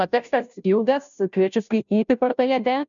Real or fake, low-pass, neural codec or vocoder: fake; 7.2 kHz; codec, 16 kHz, 0.5 kbps, FunCodec, trained on Chinese and English, 25 frames a second